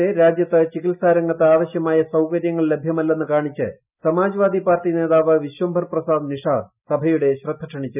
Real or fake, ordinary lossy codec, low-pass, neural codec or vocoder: real; none; 3.6 kHz; none